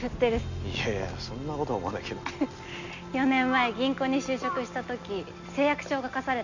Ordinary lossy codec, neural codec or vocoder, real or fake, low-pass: none; none; real; 7.2 kHz